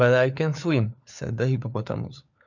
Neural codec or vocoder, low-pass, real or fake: codec, 16 kHz, 4 kbps, FunCodec, trained on LibriTTS, 50 frames a second; 7.2 kHz; fake